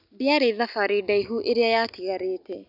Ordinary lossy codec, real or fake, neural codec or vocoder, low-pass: none; real; none; 5.4 kHz